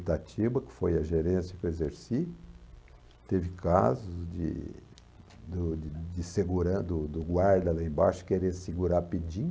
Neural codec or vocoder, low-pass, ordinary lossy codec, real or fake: none; none; none; real